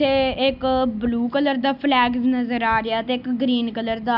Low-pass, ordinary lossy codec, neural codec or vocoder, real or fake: 5.4 kHz; none; none; real